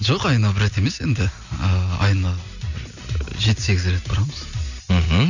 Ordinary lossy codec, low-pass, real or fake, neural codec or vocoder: none; 7.2 kHz; real; none